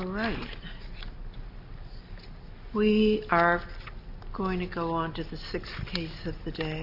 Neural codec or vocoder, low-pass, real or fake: none; 5.4 kHz; real